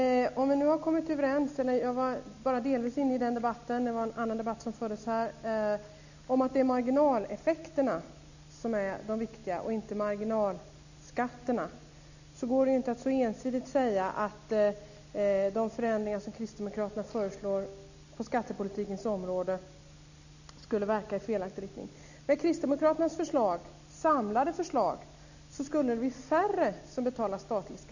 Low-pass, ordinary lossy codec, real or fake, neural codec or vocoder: 7.2 kHz; none; real; none